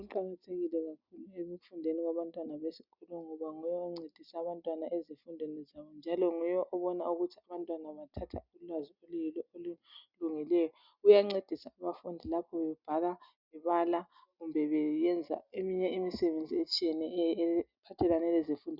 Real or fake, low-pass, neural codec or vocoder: real; 5.4 kHz; none